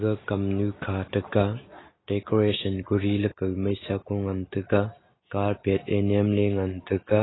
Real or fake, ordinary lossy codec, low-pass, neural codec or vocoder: real; AAC, 16 kbps; 7.2 kHz; none